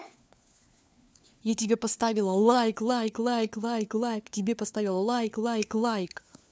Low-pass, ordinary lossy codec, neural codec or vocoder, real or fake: none; none; codec, 16 kHz, 4 kbps, FunCodec, trained on LibriTTS, 50 frames a second; fake